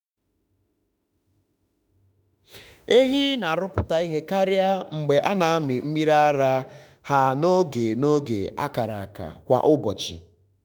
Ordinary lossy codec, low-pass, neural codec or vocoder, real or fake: none; none; autoencoder, 48 kHz, 32 numbers a frame, DAC-VAE, trained on Japanese speech; fake